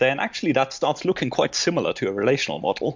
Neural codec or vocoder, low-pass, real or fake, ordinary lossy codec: none; 7.2 kHz; real; MP3, 64 kbps